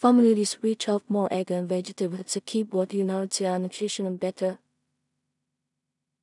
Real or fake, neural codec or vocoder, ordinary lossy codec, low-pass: fake; codec, 16 kHz in and 24 kHz out, 0.4 kbps, LongCat-Audio-Codec, two codebook decoder; none; 10.8 kHz